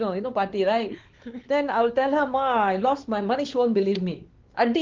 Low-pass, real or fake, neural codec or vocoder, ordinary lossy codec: 7.2 kHz; fake; codec, 16 kHz, 4 kbps, X-Codec, WavLM features, trained on Multilingual LibriSpeech; Opus, 16 kbps